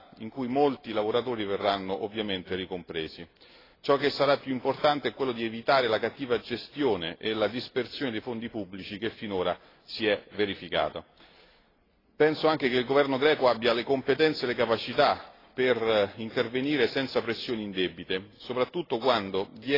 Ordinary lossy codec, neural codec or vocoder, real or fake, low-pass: AAC, 24 kbps; none; real; 5.4 kHz